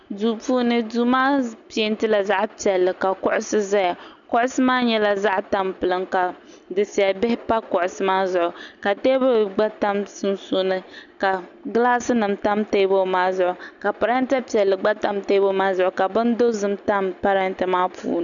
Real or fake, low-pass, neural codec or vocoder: real; 7.2 kHz; none